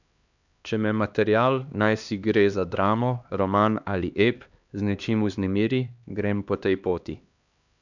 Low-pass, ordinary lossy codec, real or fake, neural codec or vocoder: 7.2 kHz; none; fake; codec, 16 kHz, 2 kbps, X-Codec, HuBERT features, trained on LibriSpeech